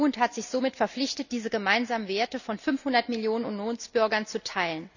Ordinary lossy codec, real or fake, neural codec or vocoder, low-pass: none; real; none; 7.2 kHz